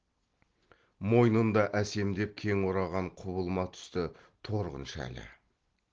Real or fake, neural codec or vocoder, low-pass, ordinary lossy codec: real; none; 7.2 kHz; Opus, 16 kbps